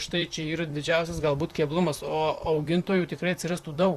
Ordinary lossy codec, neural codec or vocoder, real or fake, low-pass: Opus, 64 kbps; vocoder, 44.1 kHz, 128 mel bands, Pupu-Vocoder; fake; 14.4 kHz